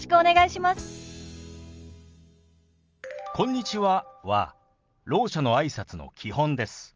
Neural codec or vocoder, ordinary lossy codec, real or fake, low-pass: none; Opus, 24 kbps; real; 7.2 kHz